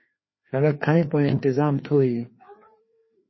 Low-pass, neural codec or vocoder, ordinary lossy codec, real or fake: 7.2 kHz; codec, 16 kHz, 2 kbps, FreqCodec, larger model; MP3, 24 kbps; fake